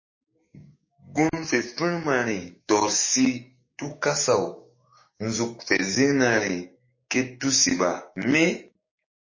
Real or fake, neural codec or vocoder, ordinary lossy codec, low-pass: fake; codec, 16 kHz, 6 kbps, DAC; MP3, 32 kbps; 7.2 kHz